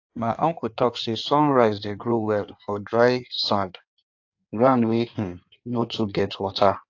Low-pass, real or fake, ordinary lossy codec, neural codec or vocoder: 7.2 kHz; fake; AAC, 48 kbps; codec, 16 kHz in and 24 kHz out, 1.1 kbps, FireRedTTS-2 codec